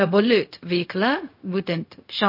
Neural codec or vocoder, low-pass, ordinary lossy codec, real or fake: codec, 16 kHz, 0.4 kbps, LongCat-Audio-Codec; 5.4 kHz; MP3, 32 kbps; fake